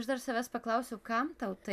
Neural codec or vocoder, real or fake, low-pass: none; real; 14.4 kHz